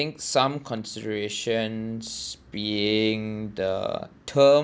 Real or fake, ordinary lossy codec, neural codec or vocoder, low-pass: real; none; none; none